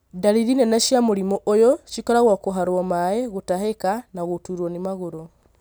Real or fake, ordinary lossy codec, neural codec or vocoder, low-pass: real; none; none; none